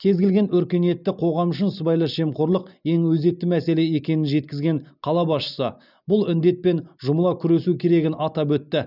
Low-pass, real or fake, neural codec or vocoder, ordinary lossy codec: 5.4 kHz; real; none; none